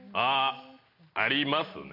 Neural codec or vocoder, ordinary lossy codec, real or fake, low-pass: none; none; real; 5.4 kHz